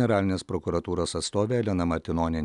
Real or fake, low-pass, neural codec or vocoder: real; 10.8 kHz; none